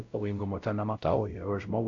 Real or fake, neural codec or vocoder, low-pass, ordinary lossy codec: fake; codec, 16 kHz, 0.5 kbps, X-Codec, WavLM features, trained on Multilingual LibriSpeech; 7.2 kHz; AAC, 48 kbps